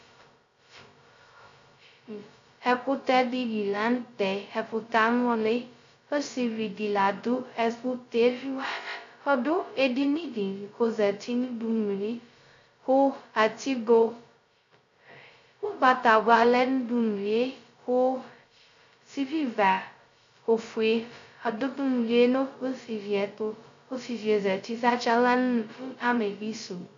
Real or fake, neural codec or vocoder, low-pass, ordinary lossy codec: fake; codec, 16 kHz, 0.2 kbps, FocalCodec; 7.2 kHz; MP3, 64 kbps